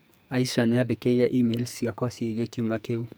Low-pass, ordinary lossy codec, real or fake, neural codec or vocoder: none; none; fake; codec, 44.1 kHz, 2.6 kbps, SNAC